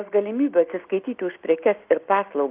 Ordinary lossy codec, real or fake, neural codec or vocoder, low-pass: Opus, 32 kbps; real; none; 3.6 kHz